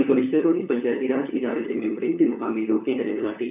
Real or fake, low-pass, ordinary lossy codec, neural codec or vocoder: fake; 3.6 kHz; none; codec, 16 kHz, 4 kbps, FunCodec, trained on LibriTTS, 50 frames a second